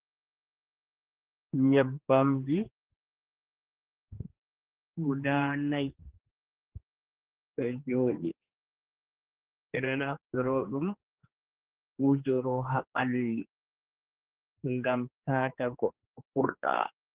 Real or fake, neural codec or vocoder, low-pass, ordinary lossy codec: fake; codec, 16 kHz, 2 kbps, X-Codec, HuBERT features, trained on general audio; 3.6 kHz; Opus, 16 kbps